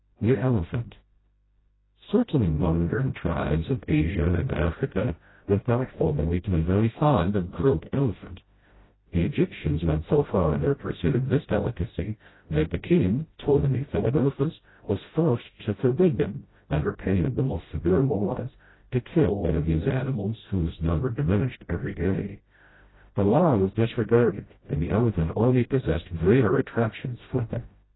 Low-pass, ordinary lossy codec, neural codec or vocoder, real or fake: 7.2 kHz; AAC, 16 kbps; codec, 16 kHz, 0.5 kbps, FreqCodec, smaller model; fake